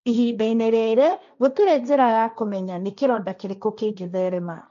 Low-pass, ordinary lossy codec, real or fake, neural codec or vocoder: 7.2 kHz; none; fake; codec, 16 kHz, 1.1 kbps, Voila-Tokenizer